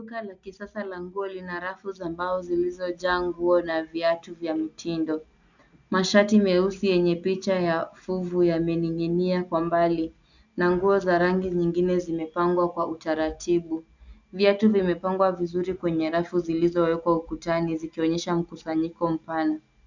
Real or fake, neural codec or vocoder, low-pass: real; none; 7.2 kHz